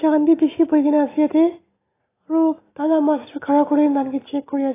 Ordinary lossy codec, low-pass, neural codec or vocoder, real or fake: AAC, 16 kbps; 3.6 kHz; none; real